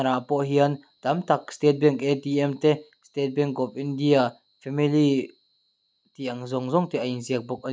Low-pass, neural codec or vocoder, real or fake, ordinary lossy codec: none; none; real; none